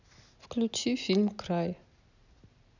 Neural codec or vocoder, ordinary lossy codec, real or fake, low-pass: none; none; real; 7.2 kHz